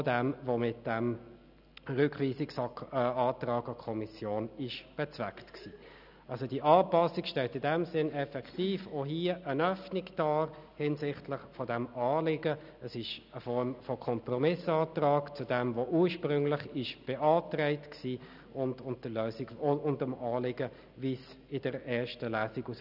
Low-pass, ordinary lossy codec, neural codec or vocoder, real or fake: 5.4 kHz; none; none; real